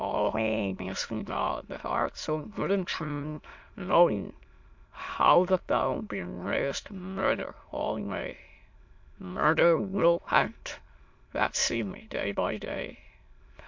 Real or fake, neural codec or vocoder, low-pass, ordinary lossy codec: fake; autoencoder, 22.05 kHz, a latent of 192 numbers a frame, VITS, trained on many speakers; 7.2 kHz; MP3, 48 kbps